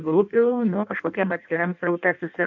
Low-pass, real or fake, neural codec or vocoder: 7.2 kHz; fake; codec, 16 kHz in and 24 kHz out, 0.6 kbps, FireRedTTS-2 codec